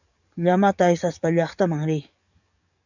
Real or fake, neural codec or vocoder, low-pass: fake; codec, 44.1 kHz, 7.8 kbps, DAC; 7.2 kHz